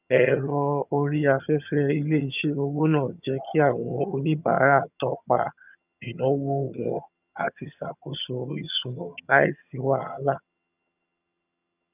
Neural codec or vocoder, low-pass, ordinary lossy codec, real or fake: vocoder, 22.05 kHz, 80 mel bands, HiFi-GAN; 3.6 kHz; none; fake